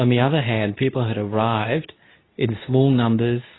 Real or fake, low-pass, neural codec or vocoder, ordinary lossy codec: fake; 7.2 kHz; codec, 24 kHz, 0.9 kbps, WavTokenizer, medium speech release version 2; AAC, 16 kbps